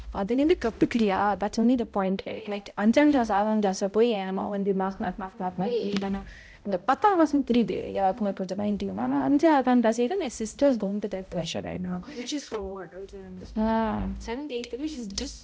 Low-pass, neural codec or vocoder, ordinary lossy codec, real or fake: none; codec, 16 kHz, 0.5 kbps, X-Codec, HuBERT features, trained on balanced general audio; none; fake